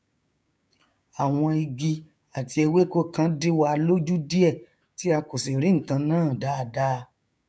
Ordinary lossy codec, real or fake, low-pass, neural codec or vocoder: none; fake; none; codec, 16 kHz, 6 kbps, DAC